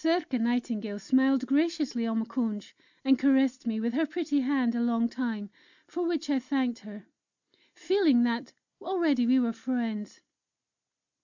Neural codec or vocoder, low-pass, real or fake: none; 7.2 kHz; real